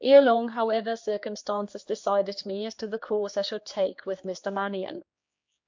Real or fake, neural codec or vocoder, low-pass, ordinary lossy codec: fake; codec, 16 kHz, 4 kbps, X-Codec, HuBERT features, trained on general audio; 7.2 kHz; MP3, 48 kbps